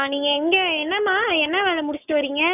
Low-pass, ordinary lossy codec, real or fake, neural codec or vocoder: 3.6 kHz; none; fake; codec, 44.1 kHz, 7.8 kbps, Pupu-Codec